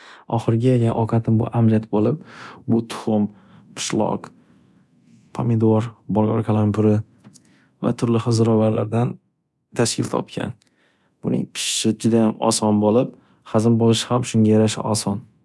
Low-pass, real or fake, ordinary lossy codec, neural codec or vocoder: none; fake; none; codec, 24 kHz, 0.9 kbps, DualCodec